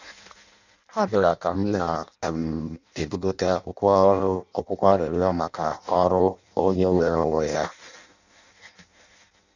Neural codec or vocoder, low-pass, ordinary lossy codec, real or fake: codec, 16 kHz in and 24 kHz out, 0.6 kbps, FireRedTTS-2 codec; 7.2 kHz; none; fake